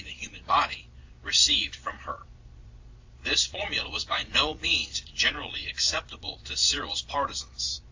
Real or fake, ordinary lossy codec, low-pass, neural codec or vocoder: fake; AAC, 48 kbps; 7.2 kHz; vocoder, 22.05 kHz, 80 mel bands, Vocos